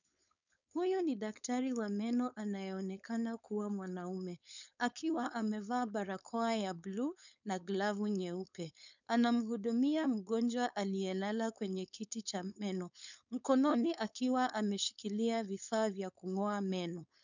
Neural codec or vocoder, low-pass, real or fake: codec, 16 kHz, 4.8 kbps, FACodec; 7.2 kHz; fake